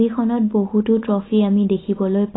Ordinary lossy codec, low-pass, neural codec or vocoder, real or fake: AAC, 16 kbps; 7.2 kHz; none; real